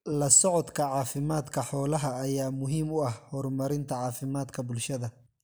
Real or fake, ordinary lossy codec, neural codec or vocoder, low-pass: real; none; none; none